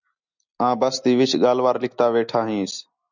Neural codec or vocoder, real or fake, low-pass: none; real; 7.2 kHz